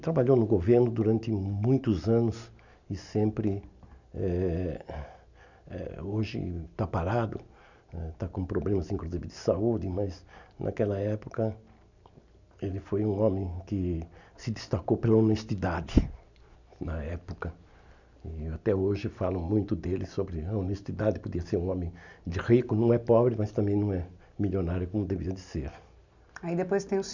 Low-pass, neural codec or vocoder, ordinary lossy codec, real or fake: 7.2 kHz; none; none; real